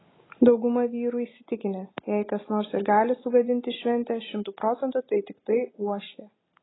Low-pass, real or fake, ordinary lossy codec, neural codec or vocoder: 7.2 kHz; real; AAC, 16 kbps; none